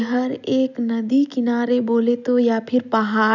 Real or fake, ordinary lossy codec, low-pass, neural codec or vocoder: real; none; 7.2 kHz; none